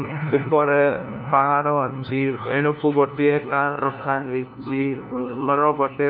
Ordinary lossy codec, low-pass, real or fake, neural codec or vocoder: none; 5.4 kHz; fake; codec, 16 kHz, 1 kbps, FunCodec, trained on LibriTTS, 50 frames a second